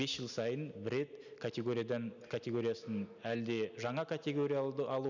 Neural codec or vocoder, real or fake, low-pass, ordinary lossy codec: none; real; 7.2 kHz; none